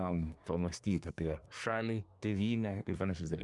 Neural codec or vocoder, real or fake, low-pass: codec, 24 kHz, 1 kbps, SNAC; fake; 10.8 kHz